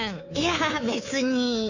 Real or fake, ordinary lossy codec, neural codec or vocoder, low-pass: fake; none; codec, 24 kHz, 3.1 kbps, DualCodec; 7.2 kHz